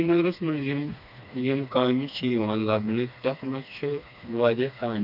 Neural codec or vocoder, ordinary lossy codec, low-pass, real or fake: codec, 16 kHz, 2 kbps, FreqCodec, smaller model; none; 5.4 kHz; fake